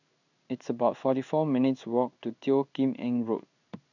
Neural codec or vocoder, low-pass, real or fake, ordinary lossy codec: codec, 16 kHz in and 24 kHz out, 1 kbps, XY-Tokenizer; 7.2 kHz; fake; none